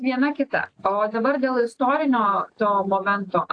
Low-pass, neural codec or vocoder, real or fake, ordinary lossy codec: 9.9 kHz; none; real; AAC, 48 kbps